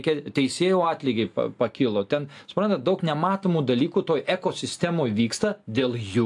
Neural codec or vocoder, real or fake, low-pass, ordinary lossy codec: none; real; 10.8 kHz; AAC, 64 kbps